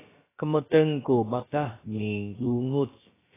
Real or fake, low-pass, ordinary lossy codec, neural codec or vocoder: fake; 3.6 kHz; AAC, 16 kbps; codec, 16 kHz, about 1 kbps, DyCAST, with the encoder's durations